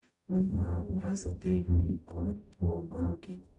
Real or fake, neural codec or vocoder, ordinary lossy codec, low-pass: fake; codec, 44.1 kHz, 0.9 kbps, DAC; none; 10.8 kHz